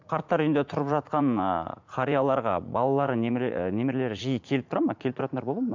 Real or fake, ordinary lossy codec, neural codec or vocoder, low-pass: fake; MP3, 64 kbps; vocoder, 44.1 kHz, 80 mel bands, Vocos; 7.2 kHz